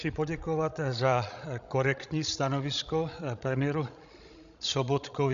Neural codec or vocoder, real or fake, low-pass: codec, 16 kHz, 16 kbps, FreqCodec, larger model; fake; 7.2 kHz